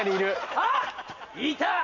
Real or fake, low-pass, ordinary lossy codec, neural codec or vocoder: fake; 7.2 kHz; none; vocoder, 44.1 kHz, 128 mel bands every 256 samples, BigVGAN v2